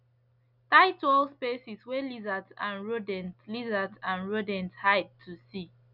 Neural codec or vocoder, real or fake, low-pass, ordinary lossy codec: none; real; 5.4 kHz; none